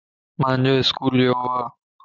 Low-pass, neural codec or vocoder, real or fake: 7.2 kHz; none; real